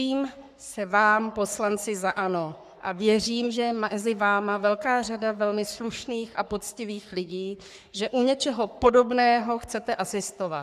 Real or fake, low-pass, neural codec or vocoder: fake; 14.4 kHz; codec, 44.1 kHz, 3.4 kbps, Pupu-Codec